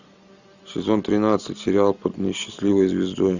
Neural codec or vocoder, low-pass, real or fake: none; 7.2 kHz; real